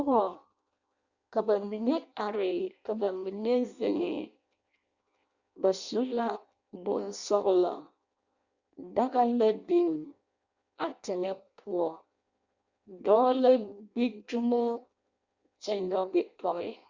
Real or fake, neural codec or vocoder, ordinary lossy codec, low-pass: fake; codec, 16 kHz in and 24 kHz out, 0.6 kbps, FireRedTTS-2 codec; Opus, 64 kbps; 7.2 kHz